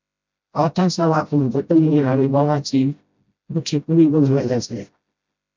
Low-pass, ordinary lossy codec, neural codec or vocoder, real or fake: 7.2 kHz; MP3, 64 kbps; codec, 16 kHz, 0.5 kbps, FreqCodec, smaller model; fake